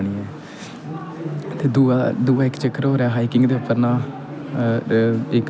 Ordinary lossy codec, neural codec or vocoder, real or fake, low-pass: none; none; real; none